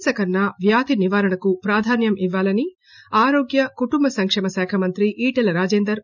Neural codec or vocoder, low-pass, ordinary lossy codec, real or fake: none; 7.2 kHz; none; real